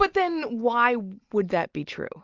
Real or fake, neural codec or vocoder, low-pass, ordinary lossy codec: real; none; 7.2 kHz; Opus, 24 kbps